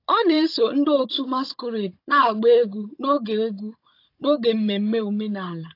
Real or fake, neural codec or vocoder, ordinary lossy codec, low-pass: fake; codec, 16 kHz, 16 kbps, FunCodec, trained on Chinese and English, 50 frames a second; AAC, 32 kbps; 5.4 kHz